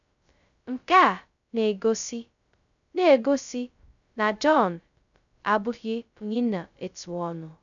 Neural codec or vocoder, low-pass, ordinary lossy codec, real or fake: codec, 16 kHz, 0.2 kbps, FocalCodec; 7.2 kHz; none; fake